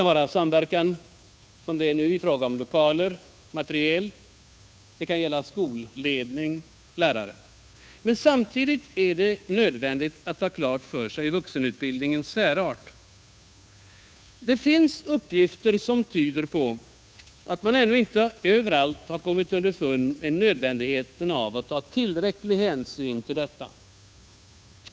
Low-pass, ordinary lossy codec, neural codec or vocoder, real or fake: none; none; codec, 16 kHz, 2 kbps, FunCodec, trained on Chinese and English, 25 frames a second; fake